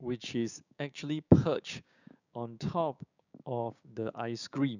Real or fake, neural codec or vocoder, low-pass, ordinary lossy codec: fake; codec, 16 kHz, 6 kbps, DAC; 7.2 kHz; none